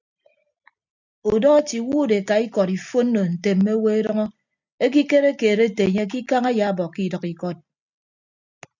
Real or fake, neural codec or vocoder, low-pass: real; none; 7.2 kHz